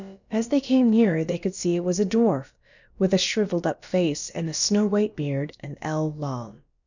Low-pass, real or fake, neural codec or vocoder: 7.2 kHz; fake; codec, 16 kHz, about 1 kbps, DyCAST, with the encoder's durations